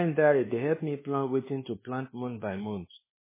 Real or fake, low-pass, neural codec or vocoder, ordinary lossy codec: fake; 3.6 kHz; codec, 24 kHz, 1.2 kbps, DualCodec; MP3, 16 kbps